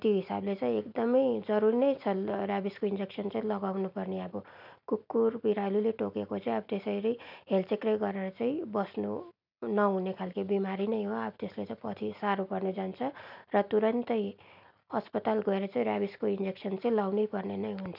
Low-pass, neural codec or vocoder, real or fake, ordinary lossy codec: 5.4 kHz; none; real; none